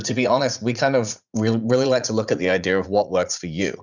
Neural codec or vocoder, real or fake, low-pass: vocoder, 44.1 kHz, 80 mel bands, Vocos; fake; 7.2 kHz